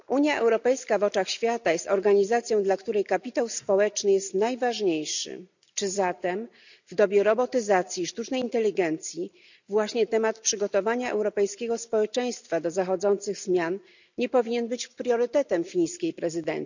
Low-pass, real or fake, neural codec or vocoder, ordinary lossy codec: 7.2 kHz; real; none; none